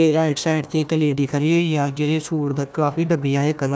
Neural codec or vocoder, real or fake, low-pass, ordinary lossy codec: codec, 16 kHz, 1 kbps, FunCodec, trained on Chinese and English, 50 frames a second; fake; none; none